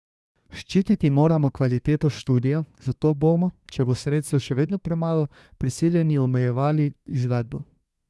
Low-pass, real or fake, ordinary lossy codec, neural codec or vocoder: none; fake; none; codec, 24 kHz, 1 kbps, SNAC